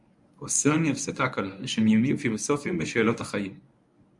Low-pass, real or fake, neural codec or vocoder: 10.8 kHz; fake; codec, 24 kHz, 0.9 kbps, WavTokenizer, medium speech release version 1